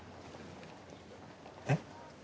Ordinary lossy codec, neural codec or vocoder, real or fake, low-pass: none; none; real; none